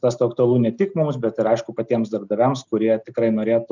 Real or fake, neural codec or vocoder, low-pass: real; none; 7.2 kHz